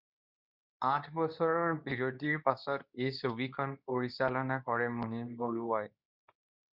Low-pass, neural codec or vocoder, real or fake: 5.4 kHz; codec, 24 kHz, 0.9 kbps, WavTokenizer, medium speech release version 2; fake